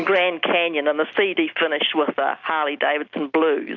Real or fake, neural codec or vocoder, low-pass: real; none; 7.2 kHz